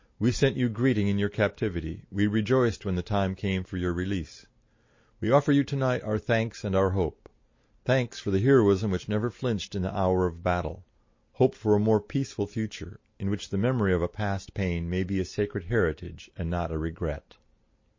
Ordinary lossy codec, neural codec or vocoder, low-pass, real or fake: MP3, 32 kbps; none; 7.2 kHz; real